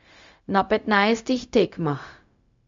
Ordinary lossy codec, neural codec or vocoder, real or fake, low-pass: MP3, 64 kbps; codec, 16 kHz, 0.4 kbps, LongCat-Audio-Codec; fake; 7.2 kHz